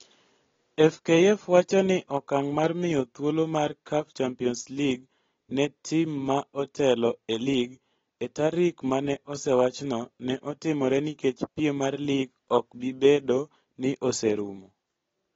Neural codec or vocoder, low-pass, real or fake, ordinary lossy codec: none; 7.2 kHz; real; AAC, 24 kbps